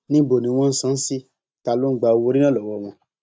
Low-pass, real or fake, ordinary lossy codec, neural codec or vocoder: none; real; none; none